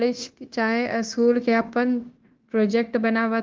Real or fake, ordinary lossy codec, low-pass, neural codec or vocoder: fake; Opus, 16 kbps; 7.2 kHz; codec, 16 kHz, 0.9 kbps, LongCat-Audio-Codec